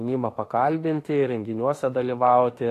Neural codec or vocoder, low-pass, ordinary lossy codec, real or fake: autoencoder, 48 kHz, 32 numbers a frame, DAC-VAE, trained on Japanese speech; 14.4 kHz; AAC, 48 kbps; fake